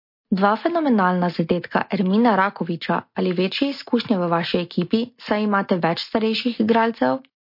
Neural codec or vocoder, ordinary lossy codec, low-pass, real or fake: none; MP3, 32 kbps; 5.4 kHz; real